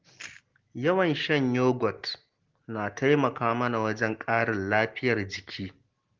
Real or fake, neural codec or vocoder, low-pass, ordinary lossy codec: real; none; 7.2 kHz; Opus, 16 kbps